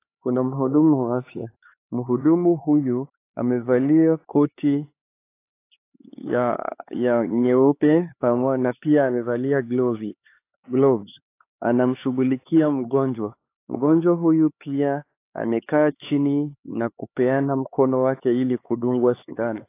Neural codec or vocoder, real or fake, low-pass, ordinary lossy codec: codec, 16 kHz, 4 kbps, X-Codec, HuBERT features, trained on LibriSpeech; fake; 3.6 kHz; AAC, 24 kbps